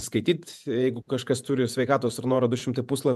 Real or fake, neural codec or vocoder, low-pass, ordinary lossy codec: fake; vocoder, 48 kHz, 128 mel bands, Vocos; 14.4 kHz; MP3, 96 kbps